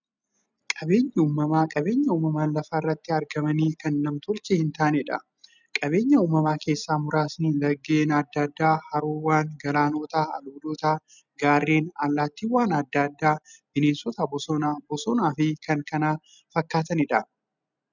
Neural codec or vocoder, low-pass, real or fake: none; 7.2 kHz; real